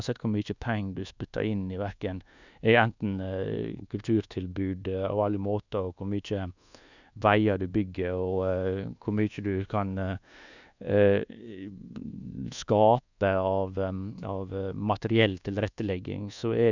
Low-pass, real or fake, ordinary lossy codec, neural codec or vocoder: 7.2 kHz; fake; none; codec, 24 kHz, 1.2 kbps, DualCodec